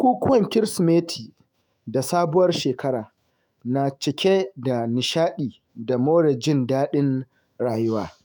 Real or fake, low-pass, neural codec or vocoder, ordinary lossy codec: fake; none; autoencoder, 48 kHz, 128 numbers a frame, DAC-VAE, trained on Japanese speech; none